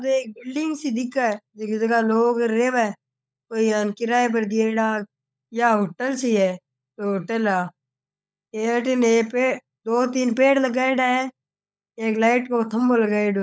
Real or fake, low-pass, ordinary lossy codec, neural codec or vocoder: fake; none; none; codec, 16 kHz, 16 kbps, FunCodec, trained on LibriTTS, 50 frames a second